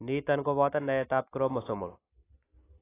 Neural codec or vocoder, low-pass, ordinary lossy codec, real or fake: none; 3.6 kHz; AAC, 16 kbps; real